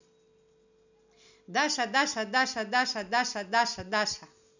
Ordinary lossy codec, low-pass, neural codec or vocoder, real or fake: none; 7.2 kHz; none; real